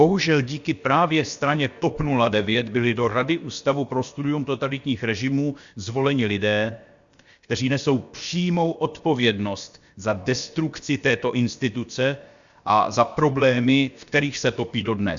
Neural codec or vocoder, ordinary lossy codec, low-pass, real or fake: codec, 16 kHz, about 1 kbps, DyCAST, with the encoder's durations; Opus, 64 kbps; 7.2 kHz; fake